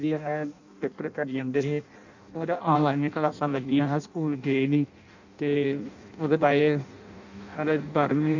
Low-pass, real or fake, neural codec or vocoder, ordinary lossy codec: 7.2 kHz; fake; codec, 16 kHz in and 24 kHz out, 0.6 kbps, FireRedTTS-2 codec; none